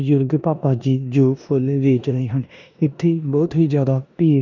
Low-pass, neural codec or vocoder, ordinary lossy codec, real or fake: 7.2 kHz; codec, 16 kHz in and 24 kHz out, 0.9 kbps, LongCat-Audio-Codec, four codebook decoder; none; fake